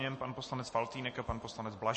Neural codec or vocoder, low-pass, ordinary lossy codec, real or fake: none; 10.8 kHz; MP3, 32 kbps; real